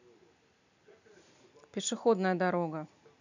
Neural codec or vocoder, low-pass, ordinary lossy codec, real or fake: vocoder, 44.1 kHz, 128 mel bands every 256 samples, BigVGAN v2; 7.2 kHz; none; fake